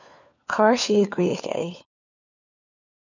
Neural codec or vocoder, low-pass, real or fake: codec, 16 kHz, 4 kbps, FunCodec, trained on LibriTTS, 50 frames a second; 7.2 kHz; fake